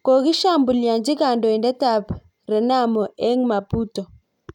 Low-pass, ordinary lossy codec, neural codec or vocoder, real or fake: 19.8 kHz; none; none; real